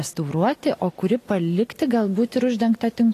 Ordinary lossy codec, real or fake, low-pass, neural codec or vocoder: AAC, 48 kbps; real; 14.4 kHz; none